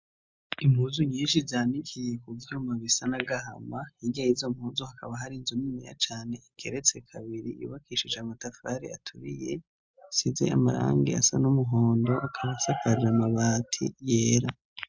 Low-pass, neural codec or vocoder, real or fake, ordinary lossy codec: 7.2 kHz; none; real; MP3, 64 kbps